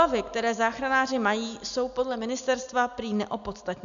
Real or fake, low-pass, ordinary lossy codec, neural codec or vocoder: real; 7.2 kHz; AAC, 96 kbps; none